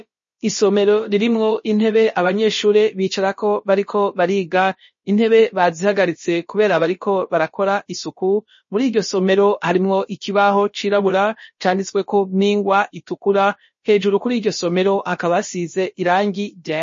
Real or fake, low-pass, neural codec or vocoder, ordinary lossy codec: fake; 7.2 kHz; codec, 16 kHz, about 1 kbps, DyCAST, with the encoder's durations; MP3, 32 kbps